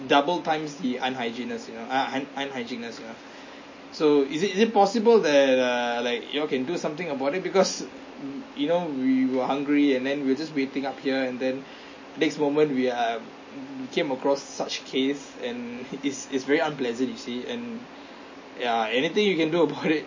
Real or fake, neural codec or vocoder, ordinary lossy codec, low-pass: real; none; MP3, 32 kbps; 7.2 kHz